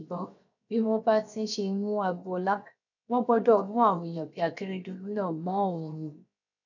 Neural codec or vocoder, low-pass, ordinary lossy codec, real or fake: codec, 16 kHz, 0.7 kbps, FocalCodec; 7.2 kHz; none; fake